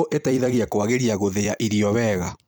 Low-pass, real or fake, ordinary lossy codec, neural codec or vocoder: none; fake; none; vocoder, 44.1 kHz, 128 mel bands every 256 samples, BigVGAN v2